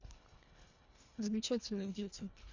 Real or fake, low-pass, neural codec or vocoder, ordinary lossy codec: fake; 7.2 kHz; codec, 24 kHz, 1.5 kbps, HILCodec; MP3, 64 kbps